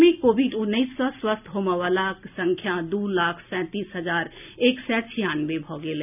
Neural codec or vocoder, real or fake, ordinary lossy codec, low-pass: none; real; none; 3.6 kHz